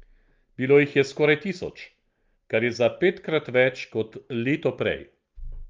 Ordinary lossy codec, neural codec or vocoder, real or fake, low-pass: Opus, 32 kbps; none; real; 7.2 kHz